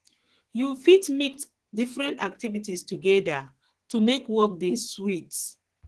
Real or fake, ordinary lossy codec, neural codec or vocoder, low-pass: fake; Opus, 16 kbps; codec, 32 kHz, 1.9 kbps, SNAC; 10.8 kHz